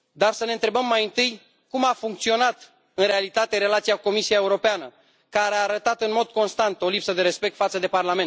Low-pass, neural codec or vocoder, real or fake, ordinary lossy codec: none; none; real; none